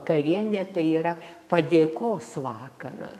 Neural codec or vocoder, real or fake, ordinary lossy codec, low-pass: codec, 32 kHz, 1.9 kbps, SNAC; fake; MP3, 96 kbps; 14.4 kHz